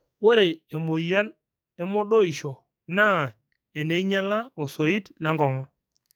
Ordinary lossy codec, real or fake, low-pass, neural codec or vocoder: none; fake; none; codec, 44.1 kHz, 2.6 kbps, SNAC